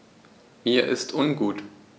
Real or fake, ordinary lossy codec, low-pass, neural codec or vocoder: real; none; none; none